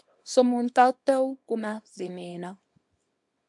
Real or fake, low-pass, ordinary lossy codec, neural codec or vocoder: fake; 10.8 kHz; MP3, 64 kbps; codec, 24 kHz, 0.9 kbps, WavTokenizer, small release